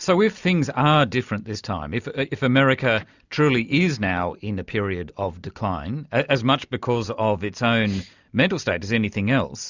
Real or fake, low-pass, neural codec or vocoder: real; 7.2 kHz; none